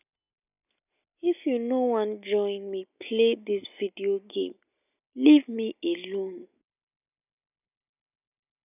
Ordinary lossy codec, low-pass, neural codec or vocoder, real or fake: none; 3.6 kHz; none; real